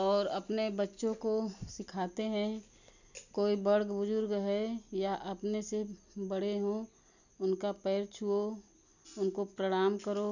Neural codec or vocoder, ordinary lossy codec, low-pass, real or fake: none; none; 7.2 kHz; real